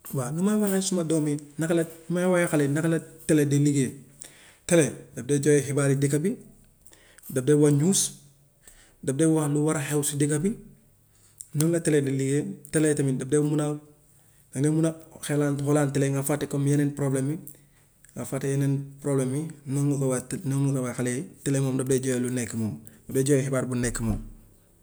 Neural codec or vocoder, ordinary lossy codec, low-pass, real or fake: none; none; none; real